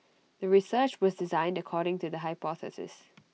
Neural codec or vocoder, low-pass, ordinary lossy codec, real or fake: none; none; none; real